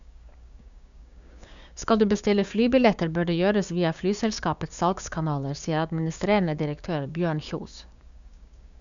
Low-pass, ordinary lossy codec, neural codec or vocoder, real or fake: 7.2 kHz; none; codec, 16 kHz, 6 kbps, DAC; fake